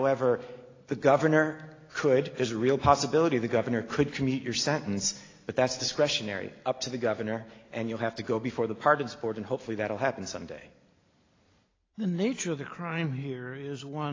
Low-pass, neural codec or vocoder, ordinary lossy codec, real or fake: 7.2 kHz; none; AAC, 32 kbps; real